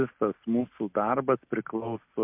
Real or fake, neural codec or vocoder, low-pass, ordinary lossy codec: real; none; 3.6 kHz; MP3, 32 kbps